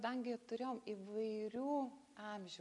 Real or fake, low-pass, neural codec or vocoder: real; 10.8 kHz; none